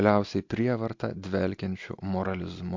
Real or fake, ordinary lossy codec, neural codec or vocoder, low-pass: real; MP3, 48 kbps; none; 7.2 kHz